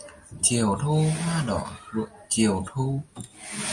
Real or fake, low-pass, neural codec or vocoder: real; 10.8 kHz; none